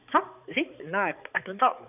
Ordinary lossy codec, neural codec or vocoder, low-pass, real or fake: none; codec, 16 kHz, 4 kbps, X-Codec, HuBERT features, trained on balanced general audio; 3.6 kHz; fake